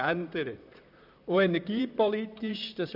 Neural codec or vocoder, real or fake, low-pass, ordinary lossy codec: vocoder, 44.1 kHz, 128 mel bands, Pupu-Vocoder; fake; 5.4 kHz; none